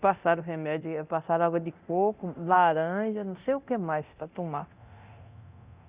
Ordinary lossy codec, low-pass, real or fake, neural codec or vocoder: Opus, 64 kbps; 3.6 kHz; fake; codec, 24 kHz, 0.9 kbps, DualCodec